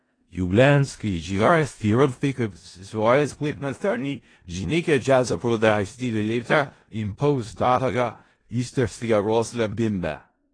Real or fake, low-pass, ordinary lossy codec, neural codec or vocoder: fake; 9.9 kHz; AAC, 32 kbps; codec, 16 kHz in and 24 kHz out, 0.4 kbps, LongCat-Audio-Codec, four codebook decoder